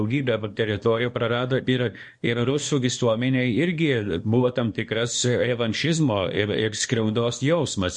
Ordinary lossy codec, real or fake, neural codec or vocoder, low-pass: MP3, 48 kbps; fake; codec, 24 kHz, 0.9 kbps, WavTokenizer, small release; 10.8 kHz